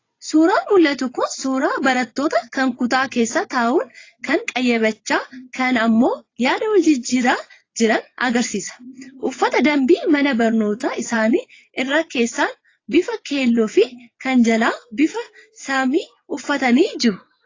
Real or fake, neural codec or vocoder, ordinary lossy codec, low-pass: fake; vocoder, 22.05 kHz, 80 mel bands, WaveNeXt; AAC, 32 kbps; 7.2 kHz